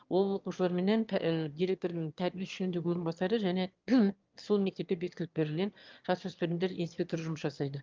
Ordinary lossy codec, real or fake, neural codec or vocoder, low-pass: Opus, 32 kbps; fake; autoencoder, 22.05 kHz, a latent of 192 numbers a frame, VITS, trained on one speaker; 7.2 kHz